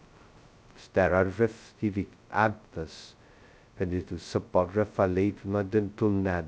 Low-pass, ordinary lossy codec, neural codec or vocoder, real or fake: none; none; codec, 16 kHz, 0.2 kbps, FocalCodec; fake